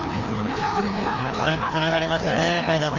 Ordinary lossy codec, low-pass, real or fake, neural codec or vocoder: none; 7.2 kHz; fake; codec, 16 kHz, 2 kbps, FreqCodec, larger model